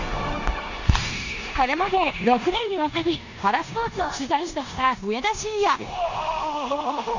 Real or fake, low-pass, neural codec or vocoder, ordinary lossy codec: fake; 7.2 kHz; codec, 16 kHz in and 24 kHz out, 0.9 kbps, LongCat-Audio-Codec, four codebook decoder; none